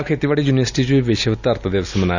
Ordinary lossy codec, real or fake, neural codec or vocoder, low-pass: none; real; none; 7.2 kHz